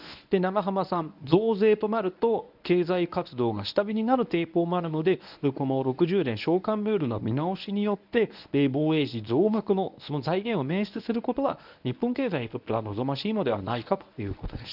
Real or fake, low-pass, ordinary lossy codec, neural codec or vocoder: fake; 5.4 kHz; none; codec, 24 kHz, 0.9 kbps, WavTokenizer, medium speech release version 1